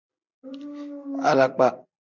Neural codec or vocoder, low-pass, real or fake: none; 7.2 kHz; real